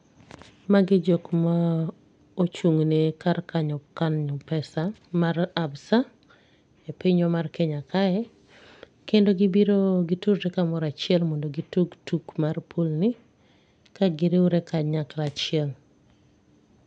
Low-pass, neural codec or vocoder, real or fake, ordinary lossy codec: 9.9 kHz; none; real; none